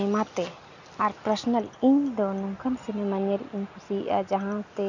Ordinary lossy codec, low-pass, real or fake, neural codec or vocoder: none; 7.2 kHz; real; none